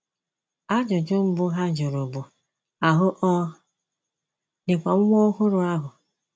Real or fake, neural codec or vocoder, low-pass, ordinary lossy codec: real; none; none; none